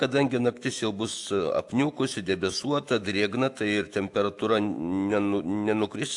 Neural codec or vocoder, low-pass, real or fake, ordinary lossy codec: autoencoder, 48 kHz, 128 numbers a frame, DAC-VAE, trained on Japanese speech; 10.8 kHz; fake; AAC, 64 kbps